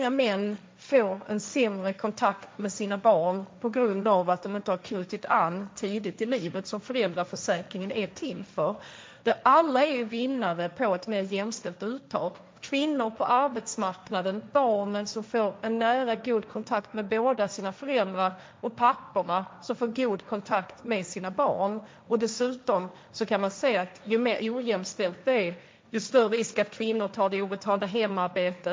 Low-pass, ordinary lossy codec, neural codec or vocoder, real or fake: none; none; codec, 16 kHz, 1.1 kbps, Voila-Tokenizer; fake